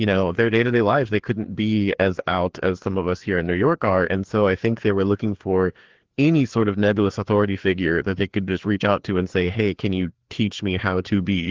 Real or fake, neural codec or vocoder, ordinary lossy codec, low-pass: fake; codec, 16 kHz, 2 kbps, FreqCodec, larger model; Opus, 16 kbps; 7.2 kHz